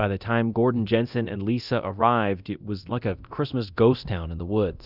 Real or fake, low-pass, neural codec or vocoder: fake; 5.4 kHz; codec, 24 kHz, 0.9 kbps, DualCodec